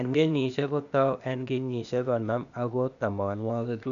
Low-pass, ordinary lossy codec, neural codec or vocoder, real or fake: 7.2 kHz; none; codec, 16 kHz, 0.8 kbps, ZipCodec; fake